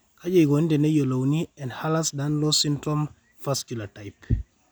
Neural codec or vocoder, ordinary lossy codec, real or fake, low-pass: none; none; real; none